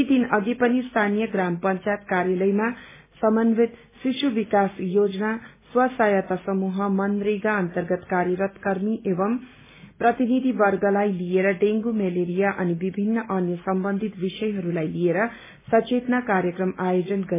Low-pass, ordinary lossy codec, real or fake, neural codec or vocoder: 3.6 kHz; MP3, 16 kbps; real; none